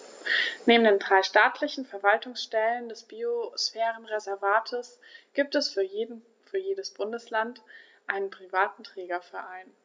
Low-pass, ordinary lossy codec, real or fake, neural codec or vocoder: none; none; real; none